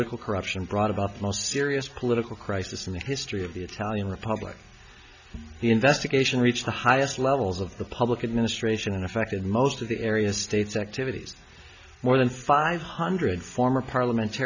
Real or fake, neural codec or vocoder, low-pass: real; none; 7.2 kHz